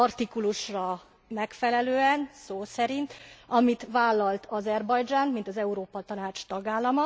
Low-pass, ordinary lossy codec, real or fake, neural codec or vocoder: none; none; real; none